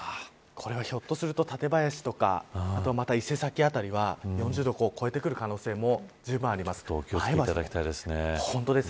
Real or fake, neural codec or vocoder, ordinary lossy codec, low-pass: real; none; none; none